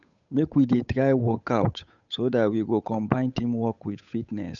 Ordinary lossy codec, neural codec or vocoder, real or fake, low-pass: none; codec, 16 kHz, 8 kbps, FunCodec, trained on Chinese and English, 25 frames a second; fake; 7.2 kHz